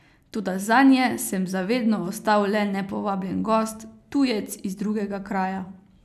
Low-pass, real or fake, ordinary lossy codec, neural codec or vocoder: 14.4 kHz; real; none; none